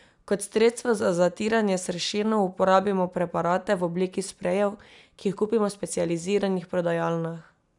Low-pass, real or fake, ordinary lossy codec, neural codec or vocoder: 10.8 kHz; real; none; none